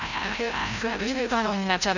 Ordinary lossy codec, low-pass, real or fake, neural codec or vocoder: none; 7.2 kHz; fake; codec, 16 kHz, 0.5 kbps, FreqCodec, larger model